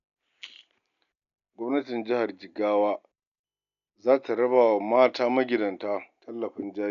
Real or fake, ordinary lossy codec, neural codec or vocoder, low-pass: real; none; none; 7.2 kHz